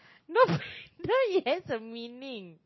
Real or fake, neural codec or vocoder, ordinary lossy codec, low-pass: real; none; MP3, 24 kbps; 7.2 kHz